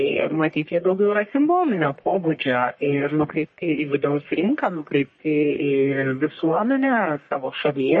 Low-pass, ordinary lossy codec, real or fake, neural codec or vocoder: 10.8 kHz; MP3, 32 kbps; fake; codec, 44.1 kHz, 1.7 kbps, Pupu-Codec